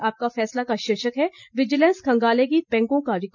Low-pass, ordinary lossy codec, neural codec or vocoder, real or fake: 7.2 kHz; none; none; real